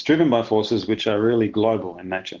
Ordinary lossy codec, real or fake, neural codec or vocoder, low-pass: Opus, 16 kbps; real; none; 7.2 kHz